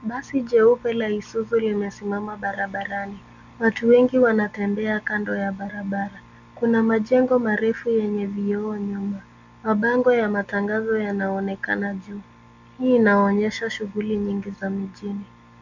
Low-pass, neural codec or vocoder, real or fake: 7.2 kHz; none; real